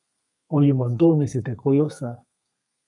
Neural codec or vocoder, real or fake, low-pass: codec, 32 kHz, 1.9 kbps, SNAC; fake; 10.8 kHz